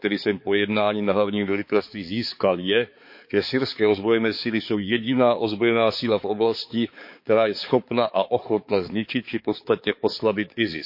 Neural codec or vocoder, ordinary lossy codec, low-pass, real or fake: codec, 16 kHz, 4 kbps, X-Codec, HuBERT features, trained on balanced general audio; MP3, 32 kbps; 5.4 kHz; fake